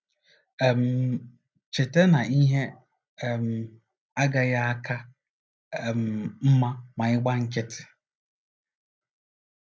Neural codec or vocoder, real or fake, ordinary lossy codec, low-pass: none; real; none; none